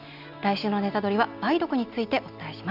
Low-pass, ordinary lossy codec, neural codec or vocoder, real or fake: 5.4 kHz; none; none; real